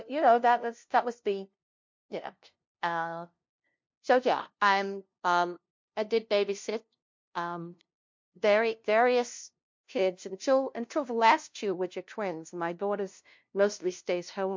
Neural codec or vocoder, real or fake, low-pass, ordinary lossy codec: codec, 16 kHz, 0.5 kbps, FunCodec, trained on LibriTTS, 25 frames a second; fake; 7.2 kHz; MP3, 48 kbps